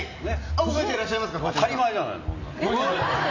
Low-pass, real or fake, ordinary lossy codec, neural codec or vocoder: 7.2 kHz; real; AAC, 32 kbps; none